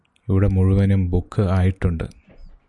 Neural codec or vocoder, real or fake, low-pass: none; real; 10.8 kHz